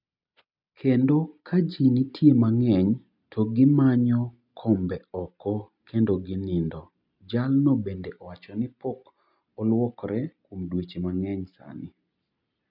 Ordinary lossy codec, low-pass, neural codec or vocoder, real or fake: none; 5.4 kHz; none; real